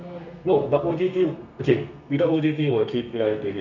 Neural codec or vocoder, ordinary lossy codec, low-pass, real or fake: codec, 32 kHz, 1.9 kbps, SNAC; none; 7.2 kHz; fake